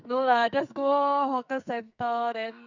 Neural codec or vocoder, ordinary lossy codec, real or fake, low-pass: codec, 44.1 kHz, 2.6 kbps, SNAC; none; fake; 7.2 kHz